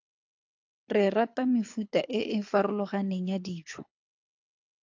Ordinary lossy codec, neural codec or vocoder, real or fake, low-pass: AAC, 48 kbps; codec, 24 kHz, 6 kbps, HILCodec; fake; 7.2 kHz